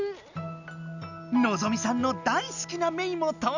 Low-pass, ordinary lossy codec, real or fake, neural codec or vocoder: 7.2 kHz; none; real; none